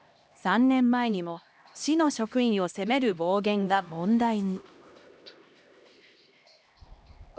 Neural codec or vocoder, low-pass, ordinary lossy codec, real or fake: codec, 16 kHz, 1 kbps, X-Codec, HuBERT features, trained on LibriSpeech; none; none; fake